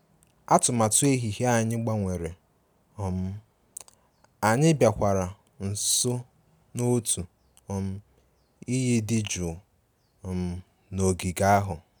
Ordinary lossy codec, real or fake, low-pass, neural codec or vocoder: none; real; none; none